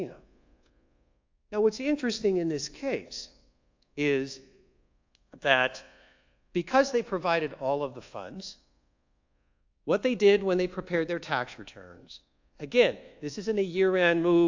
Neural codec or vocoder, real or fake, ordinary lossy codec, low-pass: codec, 24 kHz, 1.2 kbps, DualCodec; fake; AAC, 48 kbps; 7.2 kHz